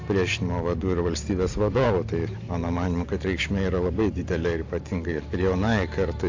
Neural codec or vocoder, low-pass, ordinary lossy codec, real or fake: vocoder, 44.1 kHz, 128 mel bands every 512 samples, BigVGAN v2; 7.2 kHz; AAC, 48 kbps; fake